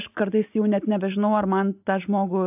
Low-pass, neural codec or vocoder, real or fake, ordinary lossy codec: 3.6 kHz; none; real; AAC, 32 kbps